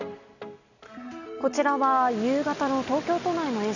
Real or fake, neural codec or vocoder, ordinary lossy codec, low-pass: real; none; none; 7.2 kHz